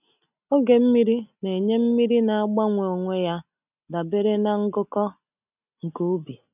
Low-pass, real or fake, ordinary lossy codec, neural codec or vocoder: 3.6 kHz; real; none; none